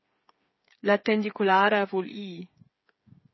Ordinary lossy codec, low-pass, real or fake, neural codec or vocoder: MP3, 24 kbps; 7.2 kHz; fake; codec, 16 kHz, 8 kbps, FreqCodec, smaller model